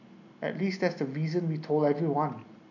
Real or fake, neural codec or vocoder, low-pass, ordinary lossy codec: real; none; 7.2 kHz; none